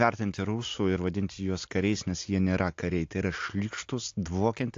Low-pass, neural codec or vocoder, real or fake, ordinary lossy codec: 7.2 kHz; none; real; AAC, 48 kbps